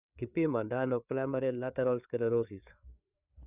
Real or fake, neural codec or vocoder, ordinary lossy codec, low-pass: fake; codec, 16 kHz, 4 kbps, FreqCodec, larger model; none; 3.6 kHz